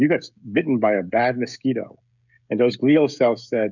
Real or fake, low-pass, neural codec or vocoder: fake; 7.2 kHz; codec, 16 kHz, 16 kbps, FreqCodec, smaller model